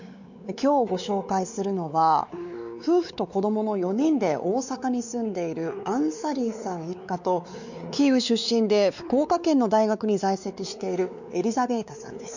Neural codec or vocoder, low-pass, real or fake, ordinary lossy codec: codec, 16 kHz, 4 kbps, X-Codec, WavLM features, trained on Multilingual LibriSpeech; 7.2 kHz; fake; none